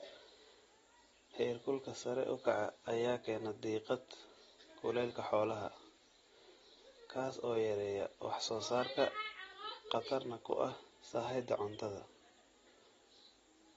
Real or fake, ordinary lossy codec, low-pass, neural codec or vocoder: real; AAC, 24 kbps; 19.8 kHz; none